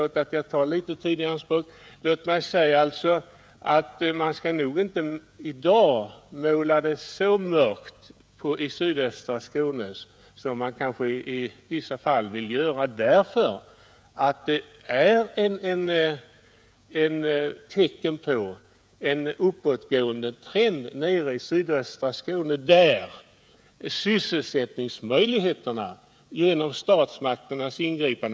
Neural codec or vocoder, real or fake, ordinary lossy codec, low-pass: codec, 16 kHz, 16 kbps, FreqCodec, smaller model; fake; none; none